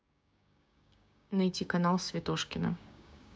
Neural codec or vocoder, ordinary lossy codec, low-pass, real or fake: codec, 16 kHz, 6 kbps, DAC; none; none; fake